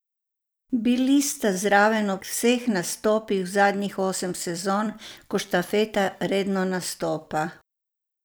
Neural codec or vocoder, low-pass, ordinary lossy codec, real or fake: vocoder, 44.1 kHz, 128 mel bands every 256 samples, BigVGAN v2; none; none; fake